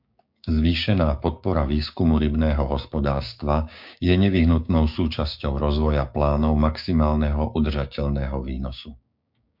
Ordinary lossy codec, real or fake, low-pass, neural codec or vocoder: MP3, 48 kbps; fake; 5.4 kHz; codec, 16 kHz, 6 kbps, DAC